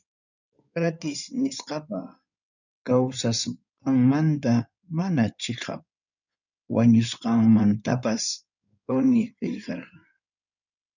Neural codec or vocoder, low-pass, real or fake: codec, 16 kHz in and 24 kHz out, 2.2 kbps, FireRedTTS-2 codec; 7.2 kHz; fake